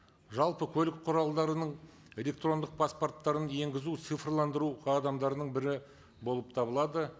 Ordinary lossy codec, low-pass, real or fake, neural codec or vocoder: none; none; real; none